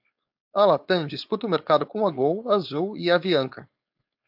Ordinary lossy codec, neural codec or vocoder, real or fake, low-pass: AAC, 48 kbps; codec, 16 kHz, 4.8 kbps, FACodec; fake; 5.4 kHz